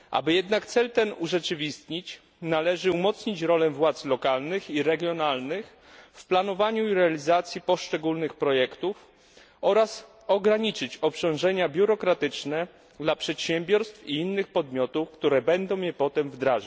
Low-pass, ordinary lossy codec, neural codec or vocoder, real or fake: none; none; none; real